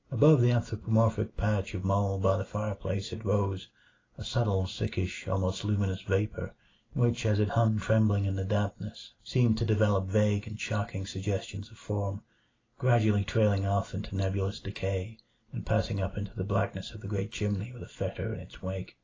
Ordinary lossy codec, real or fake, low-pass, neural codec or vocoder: AAC, 32 kbps; real; 7.2 kHz; none